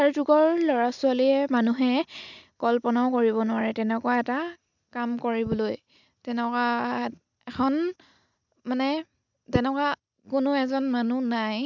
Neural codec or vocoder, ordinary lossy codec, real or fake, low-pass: none; none; real; 7.2 kHz